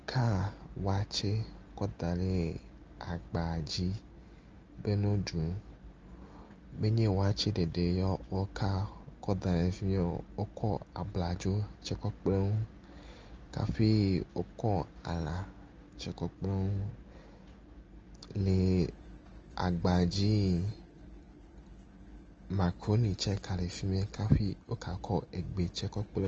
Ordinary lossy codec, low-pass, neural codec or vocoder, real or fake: Opus, 24 kbps; 7.2 kHz; none; real